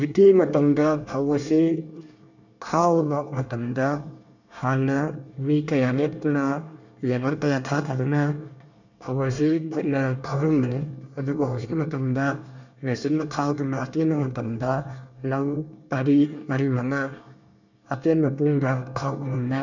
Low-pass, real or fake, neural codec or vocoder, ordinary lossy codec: 7.2 kHz; fake; codec, 24 kHz, 1 kbps, SNAC; none